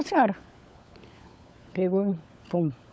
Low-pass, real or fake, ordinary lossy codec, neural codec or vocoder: none; fake; none; codec, 16 kHz, 16 kbps, FunCodec, trained on LibriTTS, 50 frames a second